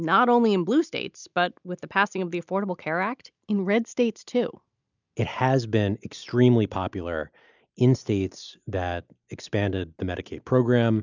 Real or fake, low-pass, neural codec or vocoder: real; 7.2 kHz; none